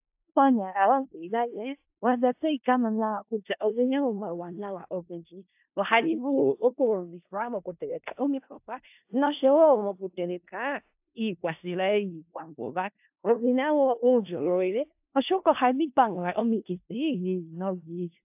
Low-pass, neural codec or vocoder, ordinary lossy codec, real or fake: 3.6 kHz; codec, 16 kHz in and 24 kHz out, 0.4 kbps, LongCat-Audio-Codec, four codebook decoder; AAC, 32 kbps; fake